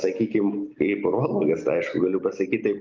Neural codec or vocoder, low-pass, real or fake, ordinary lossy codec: none; 7.2 kHz; real; Opus, 24 kbps